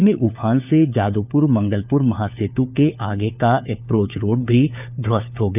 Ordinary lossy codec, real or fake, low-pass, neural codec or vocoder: none; fake; 3.6 kHz; codec, 16 kHz, 4 kbps, FunCodec, trained on LibriTTS, 50 frames a second